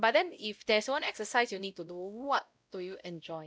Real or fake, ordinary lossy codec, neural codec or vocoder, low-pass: fake; none; codec, 16 kHz, 0.5 kbps, X-Codec, WavLM features, trained on Multilingual LibriSpeech; none